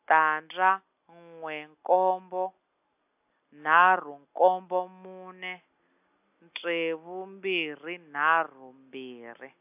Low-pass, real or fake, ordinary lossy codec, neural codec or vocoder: 3.6 kHz; real; AAC, 32 kbps; none